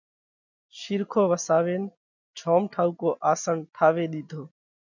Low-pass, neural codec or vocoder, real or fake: 7.2 kHz; none; real